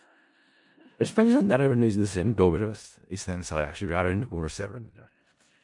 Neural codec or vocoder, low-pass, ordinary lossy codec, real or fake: codec, 16 kHz in and 24 kHz out, 0.4 kbps, LongCat-Audio-Codec, four codebook decoder; 10.8 kHz; MP3, 64 kbps; fake